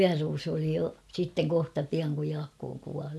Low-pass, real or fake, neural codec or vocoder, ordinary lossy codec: none; real; none; none